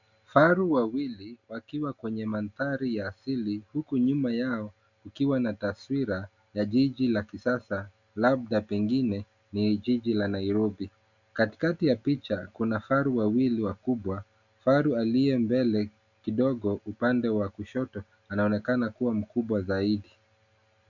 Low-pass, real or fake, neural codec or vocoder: 7.2 kHz; real; none